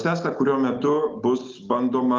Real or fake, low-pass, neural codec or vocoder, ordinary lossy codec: real; 7.2 kHz; none; Opus, 24 kbps